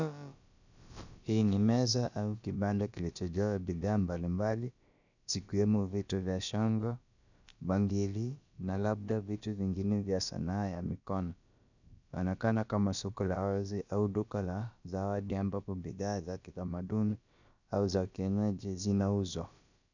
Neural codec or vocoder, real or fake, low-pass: codec, 16 kHz, about 1 kbps, DyCAST, with the encoder's durations; fake; 7.2 kHz